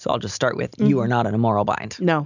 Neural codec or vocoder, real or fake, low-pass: none; real; 7.2 kHz